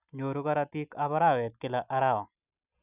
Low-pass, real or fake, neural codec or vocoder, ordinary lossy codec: 3.6 kHz; real; none; none